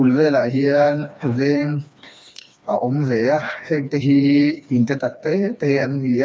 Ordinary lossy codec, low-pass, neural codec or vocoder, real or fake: none; none; codec, 16 kHz, 2 kbps, FreqCodec, smaller model; fake